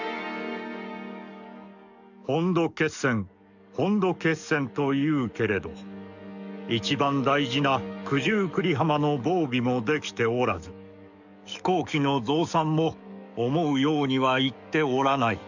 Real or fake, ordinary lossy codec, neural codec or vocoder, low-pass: fake; none; codec, 44.1 kHz, 7.8 kbps, DAC; 7.2 kHz